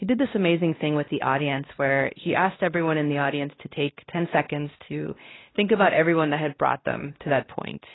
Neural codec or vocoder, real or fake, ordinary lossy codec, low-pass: codec, 16 kHz, 1 kbps, X-Codec, WavLM features, trained on Multilingual LibriSpeech; fake; AAC, 16 kbps; 7.2 kHz